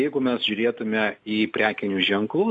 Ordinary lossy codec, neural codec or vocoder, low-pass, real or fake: MP3, 64 kbps; none; 10.8 kHz; real